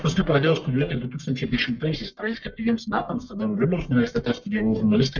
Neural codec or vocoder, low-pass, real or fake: codec, 44.1 kHz, 1.7 kbps, Pupu-Codec; 7.2 kHz; fake